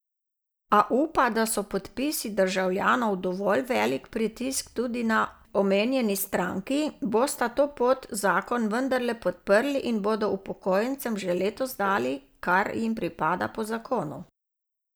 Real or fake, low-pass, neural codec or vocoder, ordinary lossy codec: real; none; none; none